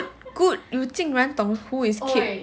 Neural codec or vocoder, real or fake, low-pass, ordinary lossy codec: none; real; none; none